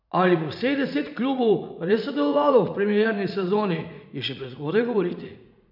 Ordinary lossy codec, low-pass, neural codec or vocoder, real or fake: none; 5.4 kHz; vocoder, 44.1 kHz, 80 mel bands, Vocos; fake